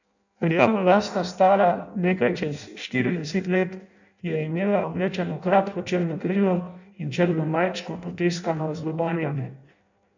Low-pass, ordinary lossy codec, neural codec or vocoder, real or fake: 7.2 kHz; none; codec, 16 kHz in and 24 kHz out, 0.6 kbps, FireRedTTS-2 codec; fake